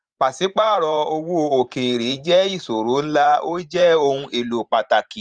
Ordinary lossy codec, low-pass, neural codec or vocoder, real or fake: Opus, 32 kbps; 9.9 kHz; vocoder, 44.1 kHz, 128 mel bands every 512 samples, BigVGAN v2; fake